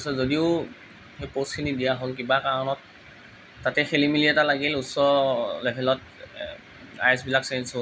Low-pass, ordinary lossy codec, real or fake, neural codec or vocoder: none; none; real; none